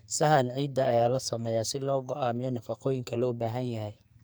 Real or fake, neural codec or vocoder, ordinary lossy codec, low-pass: fake; codec, 44.1 kHz, 2.6 kbps, SNAC; none; none